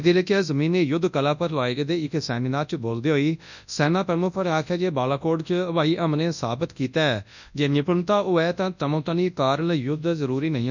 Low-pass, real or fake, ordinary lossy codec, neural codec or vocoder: 7.2 kHz; fake; none; codec, 24 kHz, 0.9 kbps, WavTokenizer, large speech release